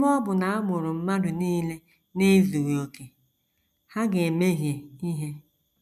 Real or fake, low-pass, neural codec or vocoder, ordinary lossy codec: real; 14.4 kHz; none; none